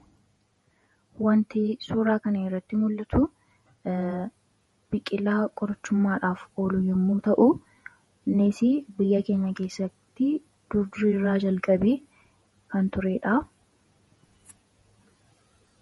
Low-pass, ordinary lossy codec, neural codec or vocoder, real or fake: 19.8 kHz; MP3, 48 kbps; vocoder, 48 kHz, 128 mel bands, Vocos; fake